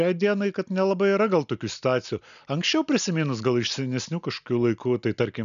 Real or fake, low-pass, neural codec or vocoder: real; 7.2 kHz; none